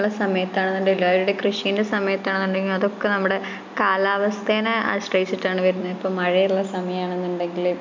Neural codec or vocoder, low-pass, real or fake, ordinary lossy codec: none; 7.2 kHz; real; MP3, 64 kbps